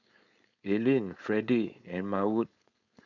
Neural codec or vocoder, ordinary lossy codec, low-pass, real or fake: codec, 16 kHz, 4.8 kbps, FACodec; none; 7.2 kHz; fake